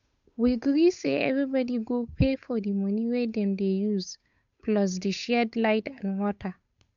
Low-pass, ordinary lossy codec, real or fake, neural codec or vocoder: 7.2 kHz; none; fake; codec, 16 kHz, 8 kbps, FunCodec, trained on Chinese and English, 25 frames a second